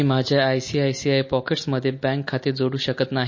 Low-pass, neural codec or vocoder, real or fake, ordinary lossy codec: 7.2 kHz; none; real; MP3, 32 kbps